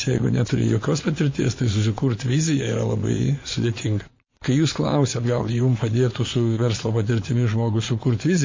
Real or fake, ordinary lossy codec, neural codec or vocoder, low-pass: fake; MP3, 32 kbps; vocoder, 22.05 kHz, 80 mel bands, Vocos; 7.2 kHz